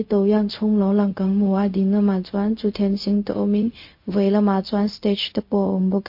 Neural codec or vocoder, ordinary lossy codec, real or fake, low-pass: codec, 16 kHz, 0.4 kbps, LongCat-Audio-Codec; MP3, 32 kbps; fake; 5.4 kHz